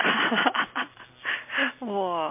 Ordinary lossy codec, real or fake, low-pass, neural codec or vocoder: MP3, 24 kbps; real; 3.6 kHz; none